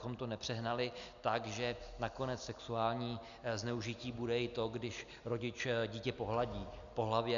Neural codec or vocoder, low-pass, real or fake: none; 7.2 kHz; real